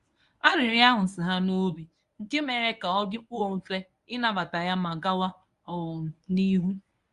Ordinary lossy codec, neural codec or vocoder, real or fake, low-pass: Opus, 64 kbps; codec, 24 kHz, 0.9 kbps, WavTokenizer, medium speech release version 1; fake; 10.8 kHz